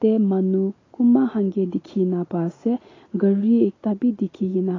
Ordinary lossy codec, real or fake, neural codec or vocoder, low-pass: AAC, 32 kbps; real; none; 7.2 kHz